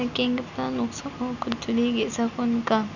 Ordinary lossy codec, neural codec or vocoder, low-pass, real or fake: none; none; 7.2 kHz; real